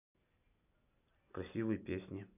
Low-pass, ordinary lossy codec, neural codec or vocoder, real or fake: 3.6 kHz; none; none; real